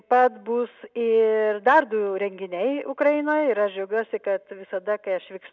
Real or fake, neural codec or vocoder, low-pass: real; none; 7.2 kHz